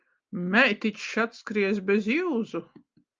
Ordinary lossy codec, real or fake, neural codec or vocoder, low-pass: Opus, 24 kbps; real; none; 7.2 kHz